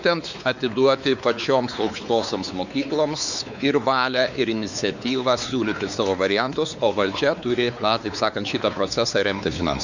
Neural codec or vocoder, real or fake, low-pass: codec, 16 kHz, 4 kbps, X-Codec, WavLM features, trained on Multilingual LibriSpeech; fake; 7.2 kHz